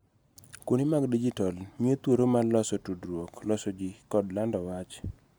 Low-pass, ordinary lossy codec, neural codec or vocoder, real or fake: none; none; none; real